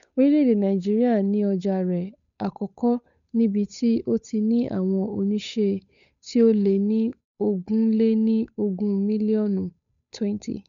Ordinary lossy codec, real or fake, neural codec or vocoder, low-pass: MP3, 96 kbps; fake; codec, 16 kHz, 8 kbps, FunCodec, trained on Chinese and English, 25 frames a second; 7.2 kHz